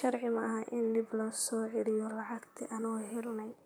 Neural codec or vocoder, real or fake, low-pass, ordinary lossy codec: vocoder, 44.1 kHz, 128 mel bands, Pupu-Vocoder; fake; none; none